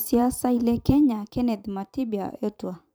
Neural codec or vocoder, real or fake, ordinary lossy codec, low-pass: vocoder, 44.1 kHz, 128 mel bands every 512 samples, BigVGAN v2; fake; none; none